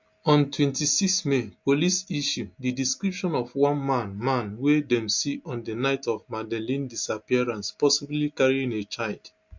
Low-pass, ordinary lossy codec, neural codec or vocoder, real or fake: 7.2 kHz; MP3, 48 kbps; none; real